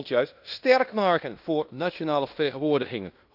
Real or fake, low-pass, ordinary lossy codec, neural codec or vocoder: fake; 5.4 kHz; none; codec, 16 kHz in and 24 kHz out, 0.9 kbps, LongCat-Audio-Codec, fine tuned four codebook decoder